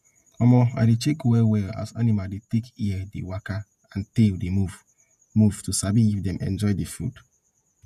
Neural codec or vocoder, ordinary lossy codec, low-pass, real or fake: vocoder, 48 kHz, 128 mel bands, Vocos; none; 14.4 kHz; fake